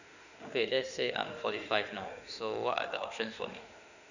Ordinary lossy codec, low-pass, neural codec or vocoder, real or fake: none; 7.2 kHz; autoencoder, 48 kHz, 32 numbers a frame, DAC-VAE, trained on Japanese speech; fake